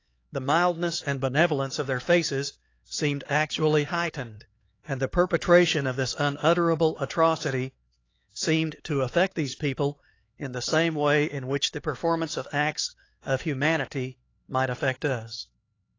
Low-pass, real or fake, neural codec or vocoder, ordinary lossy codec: 7.2 kHz; fake; codec, 16 kHz, 4 kbps, X-Codec, HuBERT features, trained on LibriSpeech; AAC, 32 kbps